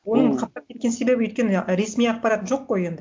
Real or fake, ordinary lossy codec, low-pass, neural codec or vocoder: real; none; none; none